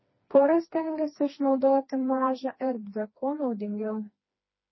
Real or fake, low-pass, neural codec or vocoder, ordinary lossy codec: fake; 7.2 kHz; codec, 16 kHz, 2 kbps, FreqCodec, smaller model; MP3, 24 kbps